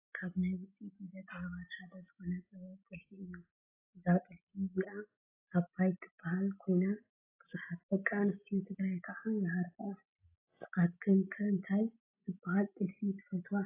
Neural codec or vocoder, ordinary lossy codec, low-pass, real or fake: none; AAC, 32 kbps; 3.6 kHz; real